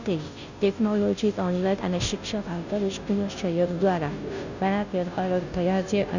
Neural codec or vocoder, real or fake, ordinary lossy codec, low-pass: codec, 16 kHz, 0.5 kbps, FunCodec, trained on Chinese and English, 25 frames a second; fake; none; 7.2 kHz